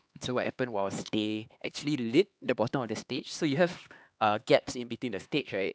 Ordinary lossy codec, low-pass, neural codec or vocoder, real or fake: none; none; codec, 16 kHz, 2 kbps, X-Codec, HuBERT features, trained on LibriSpeech; fake